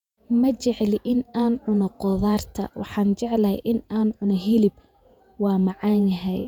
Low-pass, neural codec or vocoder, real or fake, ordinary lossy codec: 19.8 kHz; vocoder, 48 kHz, 128 mel bands, Vocos; fake; none